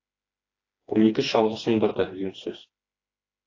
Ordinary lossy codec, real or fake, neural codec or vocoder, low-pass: AAC, 32 kbps; fake; codec, 16 kHz, 2 kbps, FreqCodec, smaller model; 7.2 kHz